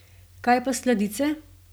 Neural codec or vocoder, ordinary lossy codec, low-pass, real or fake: none; none; none; real